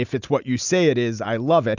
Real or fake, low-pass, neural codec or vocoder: real; 7.2 kHz; none